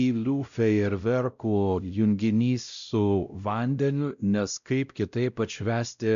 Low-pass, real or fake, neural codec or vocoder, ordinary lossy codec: 7.2 kHz; fake; codec, 16 kHz, 0.5 kbps, X-Codec, WavLM features, trained on Multilingual LibriSpeech; MP3, 96 kbps